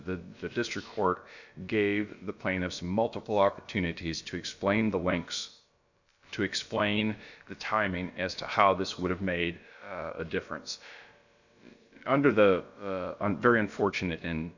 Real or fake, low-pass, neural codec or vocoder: fake; 7.2 kHz; codec, 16 kHz, about 1 kbps, DyCAST, with the encoder's durations